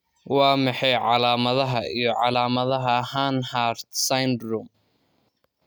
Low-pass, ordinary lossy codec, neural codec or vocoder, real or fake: none; none; none; real